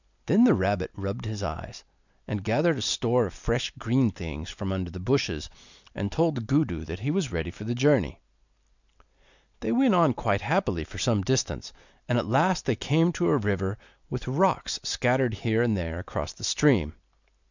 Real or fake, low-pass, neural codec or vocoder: real; 7.2 kHz; none